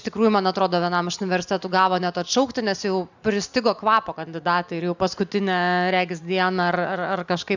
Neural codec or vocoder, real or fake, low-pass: none; real; 7.2 kHz